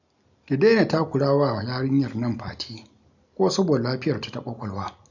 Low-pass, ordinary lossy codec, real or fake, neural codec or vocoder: 7.2 kHz; none; real; none